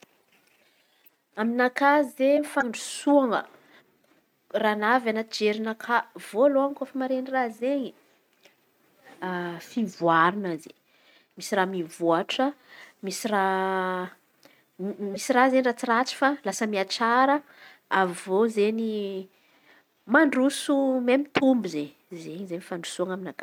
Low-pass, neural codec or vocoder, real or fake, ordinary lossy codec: 19.8 kHz; none; real; none